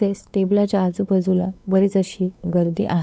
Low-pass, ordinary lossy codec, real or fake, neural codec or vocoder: none; none; fake; codec, 16 kHz, 4 kbps, X-Codec, WavLM features, trained on Multilingual LibriSpeech